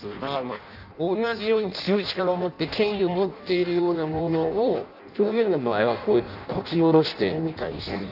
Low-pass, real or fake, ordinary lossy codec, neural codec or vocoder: 5.4 kHz; fake; none; codec, 16 kHz in and 24 kHz out, 0.6 kbps, FireRedTTS-2 codec